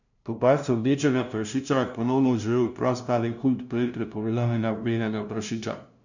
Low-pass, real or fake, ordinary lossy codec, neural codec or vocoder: 7.2 kHz; fake; none; codec, 16 kHz, 0.5 kbps, FunCodec, trained on LibriTTS, 25 frames a second